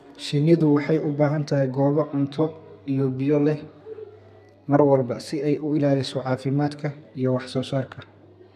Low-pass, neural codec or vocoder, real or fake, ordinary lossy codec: 14.4 kHz; codec, 32 kHz, 1.9 kbps, SNAC; fake; none